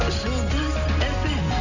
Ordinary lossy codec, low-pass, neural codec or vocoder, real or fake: none; 7.2 kHz; none; real